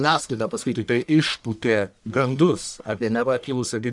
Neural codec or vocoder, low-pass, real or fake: codec, 44.1 kHz, 1.7 kbps, Pupu-Codec; 10.8 kHz; fake